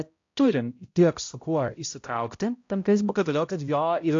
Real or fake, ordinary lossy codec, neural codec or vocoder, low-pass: fake; AAC, 48 kbps; codec, 16 kHz, 0.5 kbps, X-Codec, HuBERT features, trained on balanced general audio; 7.2 kHz